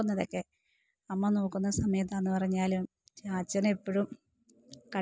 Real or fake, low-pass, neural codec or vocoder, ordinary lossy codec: real; none; none; none